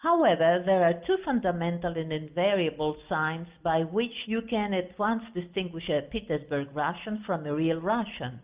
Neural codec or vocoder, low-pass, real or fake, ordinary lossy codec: none; 3.6 kHz; real; Opus, 32 kbps